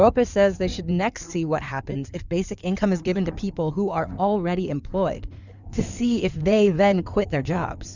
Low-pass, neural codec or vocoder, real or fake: 7.2 kHz; codec, 16 kHz in and 24 kHz out, 2.2 kbps, FireRedTTS-2 codec; fake